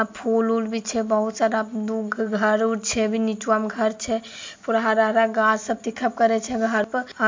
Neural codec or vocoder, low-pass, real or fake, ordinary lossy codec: none; 7.2 kHz; real; MP3, 48 kbps